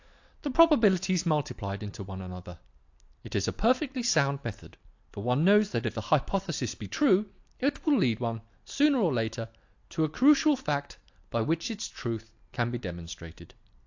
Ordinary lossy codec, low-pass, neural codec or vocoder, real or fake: AAC, 48 kbps; 7.2 kHz; none; real